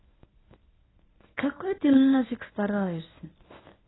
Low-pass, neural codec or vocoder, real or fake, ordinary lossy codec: 7.2 kHz; codec, 24 kHz, 0.9 kbps, WavTokenizer, medium speech release version 1; fake; AAC, 16 kbps